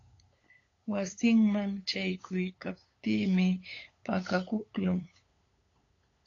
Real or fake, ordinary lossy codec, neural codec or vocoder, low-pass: fake; AAC, 32 kbps; codec, 16 kHz, 8 kbps, FunCodec, trained on LibriTTS, 25 frames a second; 7.2 kHz